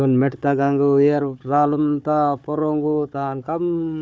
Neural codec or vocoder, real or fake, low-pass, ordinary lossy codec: codec, 16 kHz, 4 kbps, FunCodec, trained on Chinese and English, 50 frames a second; fake; none; none